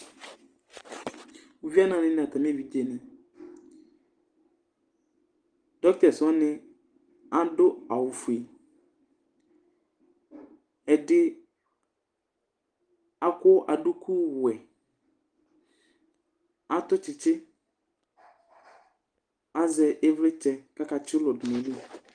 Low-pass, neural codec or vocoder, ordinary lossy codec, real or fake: 9.9 kHz; none; Opus, 24 kbps; real